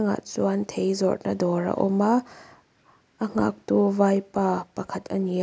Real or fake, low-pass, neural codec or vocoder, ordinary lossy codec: real; none; none; none